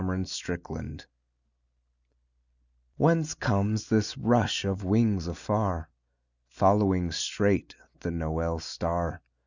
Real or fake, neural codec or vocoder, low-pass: real; none; 7.2 kHz